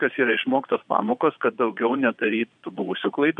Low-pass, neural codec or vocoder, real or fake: 9.9 kHz; vocoder, 22.05 kHz, 80 mel bands, Vocos; fake